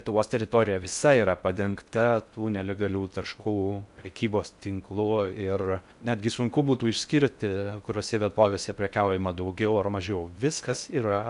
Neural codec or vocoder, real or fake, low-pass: codec, 16 kHz in and 24 kHz out, 0.6 kbps, FocalCodec, streaming, 4096 codes; fake; 10.8 kHz